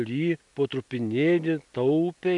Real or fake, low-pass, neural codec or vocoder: real; 10.8 kHz; none